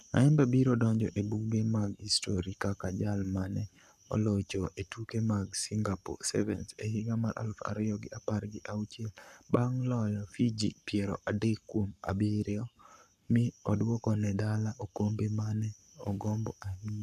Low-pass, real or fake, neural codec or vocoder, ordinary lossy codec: 14.4 kHz; fake; codec, 44.1 kHz, 7.8 kbps, Pupu-Codec; none